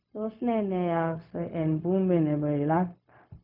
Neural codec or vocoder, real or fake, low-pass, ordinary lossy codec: codec, 16 kHz, 0.4 kbps, LongCat-Audio-Codec; fake; 5.4 kHz; none